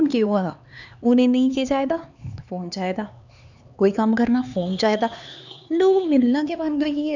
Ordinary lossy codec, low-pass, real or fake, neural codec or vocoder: none; 7.2 kHz; fake; codec, 16 kHz, 4 kbps, X-Codec, HuBERT features, trained on LibriSpeech